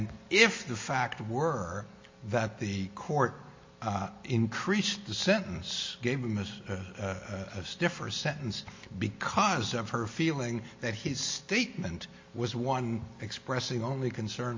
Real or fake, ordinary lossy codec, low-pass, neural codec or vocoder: real; MP3, 32 kbps; 7.2 kHz; none